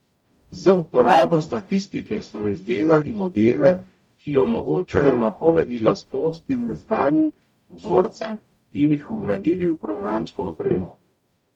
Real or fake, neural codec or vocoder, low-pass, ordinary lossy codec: fake; codec, 44.1 kHz, 0.9 kbps, DAC; 19.8 kHz; MP3, 96 kbps